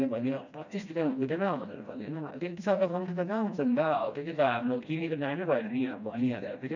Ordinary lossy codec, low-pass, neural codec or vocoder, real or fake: none; 7.2 kHz; codec, 16 kHz, 1 kbps, FreqCodec, smaller model; fake